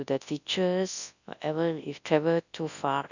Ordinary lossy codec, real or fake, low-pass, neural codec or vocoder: none; fake; 7.2 kHz; codec, 24 kHz, 0.9 kbps, WavTokenizer, large speech release